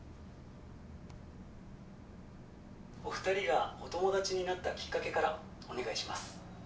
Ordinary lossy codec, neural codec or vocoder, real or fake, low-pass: none; none; real; none